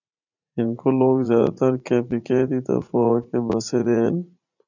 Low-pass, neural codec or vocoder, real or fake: 7.2 kHz; vocoder, 44.1 kHz, 80 mel bands, Vocos; fake